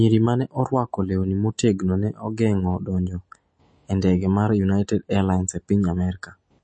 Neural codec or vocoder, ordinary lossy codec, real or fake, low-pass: none; MP3, 32 kbps; real; 9.9 kHz